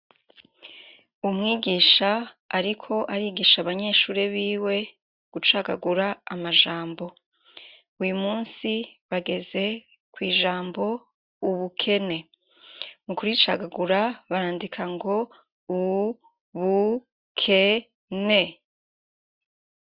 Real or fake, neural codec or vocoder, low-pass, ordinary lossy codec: real; none; 5.4 kHz; Opus, 64 kbps